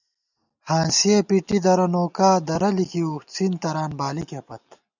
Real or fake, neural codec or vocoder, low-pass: real; none; 7.2 kHz